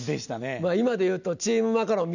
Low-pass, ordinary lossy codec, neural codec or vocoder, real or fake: 7.2 kHz; none; none; real